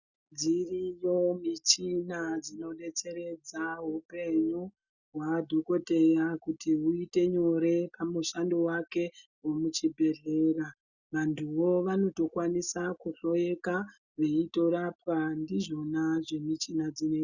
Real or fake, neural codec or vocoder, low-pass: real; none; 7.2 kHz